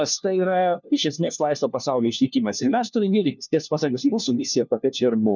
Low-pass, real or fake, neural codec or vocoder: 7.2 kHz; fake; codec, 16 kHz, 1 kbps, FunCodec, trained on LibriTTS, 50 frames a second